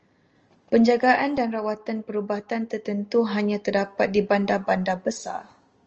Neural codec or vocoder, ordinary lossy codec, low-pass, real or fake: none; Opus, 24 kbps; 7.2 kHz; real